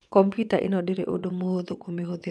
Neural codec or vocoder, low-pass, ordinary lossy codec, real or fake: vocoder, 22.05 kHz, 80 mel bands, WaveNeXt; none; none; fake